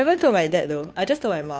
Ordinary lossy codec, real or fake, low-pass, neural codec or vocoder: none; fake; none; codec, 16 kHz, 2 kbps, FunCodec, trained on Chinese and English, 25 frames a second